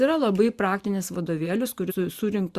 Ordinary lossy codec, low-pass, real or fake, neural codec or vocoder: Opus, 64 kbps; 14.4 kHz; fake; vocoder, 44.1 kHz, 128 mel bands every 512 samples, BigVGAN v2